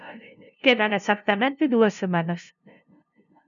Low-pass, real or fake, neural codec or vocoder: 7.2 kHz; fake; codec, 16 kHz, 0.5 kbps, FunCodec, trained on LibriTTS, 25 frames a second